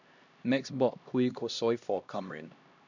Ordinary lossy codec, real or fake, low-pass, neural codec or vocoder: none; fake; 7.2 kHz; codec, 16 kHz, 1 kbps, X-Codec, HuBERT features, trained on LibriSpeech